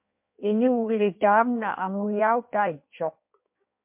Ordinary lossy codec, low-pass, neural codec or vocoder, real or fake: MP3, 32 kbps; 3.6 kHz; codec, 16 kHz in and 24 kHz out, 1.1 kbps, FireRedTTS-2 codec; fake